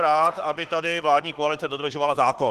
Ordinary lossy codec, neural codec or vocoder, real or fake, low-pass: Opus, 16 kbps; autoencoder, 48 kHz, 32 numbers a frame, DAC-VAE, trained on Japanese speech; fake; 14.4 kHz